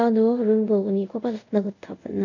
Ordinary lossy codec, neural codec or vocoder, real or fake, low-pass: none; codec, 24 kHz, 0.5 kbps, DualCodec; fake; 7.2 kHz